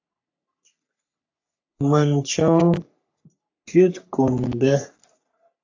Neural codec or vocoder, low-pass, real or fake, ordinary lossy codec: codec, 44.1 kHz, 3.4 kbps, Pupu-Codec; 7.2 kHz; fake; AAC, 48 kbps